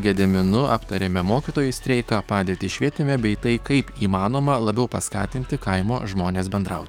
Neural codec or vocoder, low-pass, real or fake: codec, 44.1 kHz, 7.8 kbps, DAC; 19.8 kHz; fake